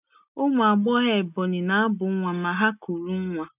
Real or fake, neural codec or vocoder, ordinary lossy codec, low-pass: real; none; none; 3.6 kHz